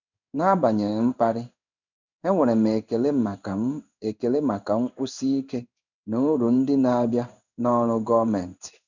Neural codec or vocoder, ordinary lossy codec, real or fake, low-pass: codec, 16 kHz in and 24 kHz out, 1 kbps, XY-Tokenizer; none; fake; 7.2 kHz